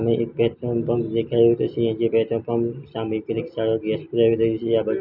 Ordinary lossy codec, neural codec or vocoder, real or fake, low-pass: none; none; real; 5.4 kHz